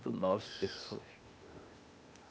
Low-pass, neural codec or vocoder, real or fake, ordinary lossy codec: none; codec, 16 kHz, 0.8 kbps, ZipCodec; fake; none